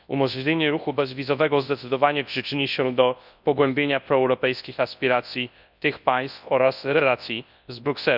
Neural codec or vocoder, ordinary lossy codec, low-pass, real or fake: codec, 24 kHz, 0.9 kbps, WavTokenizer, large speech release; none; 5.4 kHz; fake